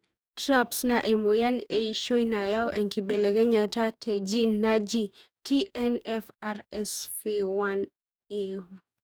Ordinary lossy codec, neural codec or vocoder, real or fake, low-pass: none; codec, 44.1 kHz, 2.6 kbps, DAC; fake; none